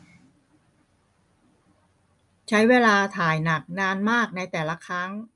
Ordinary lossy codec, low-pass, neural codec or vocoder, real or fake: none; 10.8 kHz; none; real